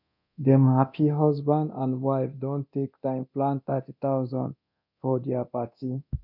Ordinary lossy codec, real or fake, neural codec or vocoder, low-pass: none; fake; codec, 24 kHz, 0.9 kbps, DualCodec; 5.4 kHz